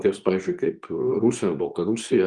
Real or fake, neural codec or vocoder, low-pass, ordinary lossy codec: fake; codec, 24 kHz, 0.9 kbps, WavTokenizer, medium speech release version 2; 10.8 kHz; Opus, 32 kbps